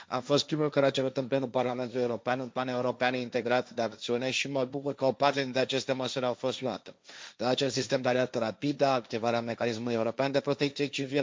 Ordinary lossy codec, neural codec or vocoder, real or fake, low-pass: none; codec, 16 kHz, 1.1 kbps, Voila-Tokenizer; fake; none